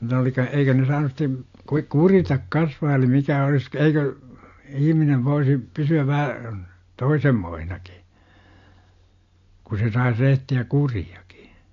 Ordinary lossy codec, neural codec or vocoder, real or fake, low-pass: AAC, 48 kbps; none; real; 7.2 kHz